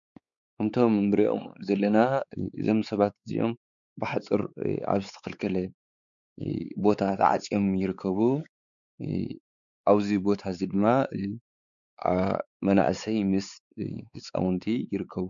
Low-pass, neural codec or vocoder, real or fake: 7.2 kHz; codec, 16 kHz, 4 kbps, X-Codec, WavLM features, trained on Multilingual LibriSpeech; fake